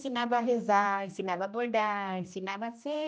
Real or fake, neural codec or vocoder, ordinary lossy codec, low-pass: fake; codec, 16 kHz, 1 kbps, X-Codec, HuBERT features, trained on general audio; none; none